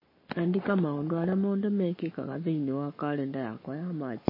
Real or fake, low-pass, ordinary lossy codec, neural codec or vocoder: fake; 5.4 kHz; MP3, 24 kbps; codec, 44.1 kHz, 7.8 kbps, Pupu-Codec